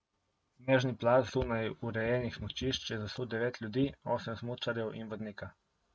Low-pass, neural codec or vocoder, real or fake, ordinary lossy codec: none; none; real; none